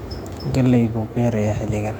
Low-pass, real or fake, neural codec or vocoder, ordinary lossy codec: 19.8 kHz; fake; autoencoder, 48 kHz, 128 numbers a frame, DAC-VAE, trained on Japanese speech; MP3, 96 kbps